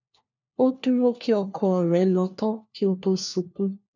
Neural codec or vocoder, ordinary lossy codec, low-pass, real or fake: codec, 16 kHz, 1 kbps, FunCodec, trained on LibriTTS, 50 frames a second; none; 7.2 kHz; fake